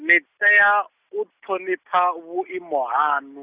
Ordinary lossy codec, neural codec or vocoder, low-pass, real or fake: Opus, 64 kbps; none; 3.6 kHz; real